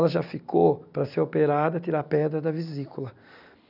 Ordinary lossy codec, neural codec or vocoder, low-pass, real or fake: none; none; 5.4 kHz; real